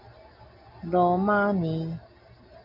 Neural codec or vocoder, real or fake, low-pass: none; real; 5.4 kHz